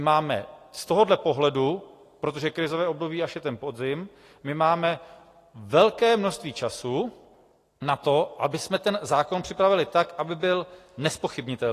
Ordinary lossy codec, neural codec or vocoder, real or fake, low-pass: AAC, 48 kbps; none; real; 14.4 kHz